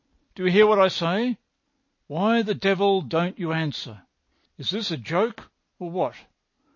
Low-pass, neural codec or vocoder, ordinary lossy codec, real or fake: 7.2 kHz; none; MP3, 32 kbps; real